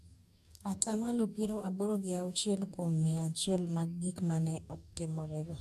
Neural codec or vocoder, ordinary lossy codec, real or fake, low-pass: codec, 44.1 kHz, 2.6 kbps, DAC; MP3, 96 kbps; fake; 14.4 kHz